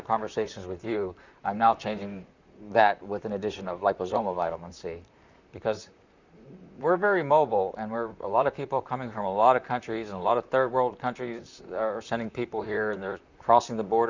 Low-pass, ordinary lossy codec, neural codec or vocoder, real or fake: 7.2 kHz; Opus, 64 kbps; vocoder, 44.1 kHz, 128 mel bands, Pupu-Vocoder; fake